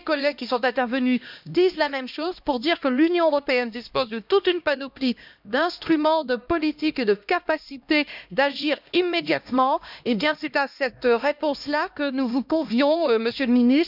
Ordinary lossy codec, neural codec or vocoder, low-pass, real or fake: none; codec, 16 kHz, 1 kbps, X-Codec, HuBERT features, trained on LibriSpeech; 5.4 kHz; fake